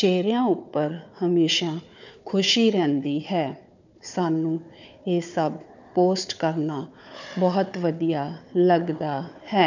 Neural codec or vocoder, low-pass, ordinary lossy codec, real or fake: codec, 16 kHz, 4 kbps, FunCodec, trained on Chinese and English, 50 frames a second; 7.2 kHz; none; fake